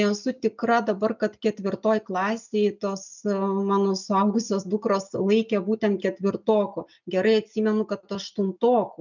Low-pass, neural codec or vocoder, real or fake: 7.2 kHz; none; real